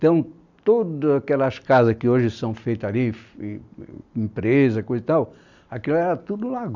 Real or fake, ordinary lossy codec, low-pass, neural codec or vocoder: real; none; 7.2 kHz; none